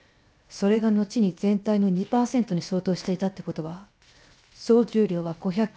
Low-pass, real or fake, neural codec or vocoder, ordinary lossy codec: none; fake; codec, 16 kHz, 0.7 kbps, FocalCodec; none